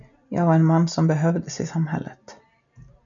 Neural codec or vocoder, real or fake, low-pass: none; real; 7.2 kHz